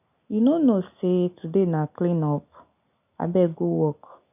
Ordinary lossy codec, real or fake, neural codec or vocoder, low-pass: none; real; none; 3.6 kHz